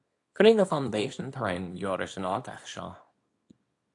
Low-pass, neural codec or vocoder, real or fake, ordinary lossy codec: 10.8 kHz; codec, 24 kHz, 0.9 kbps, WavTokenizer, small release; fake; AAC, 48 kbps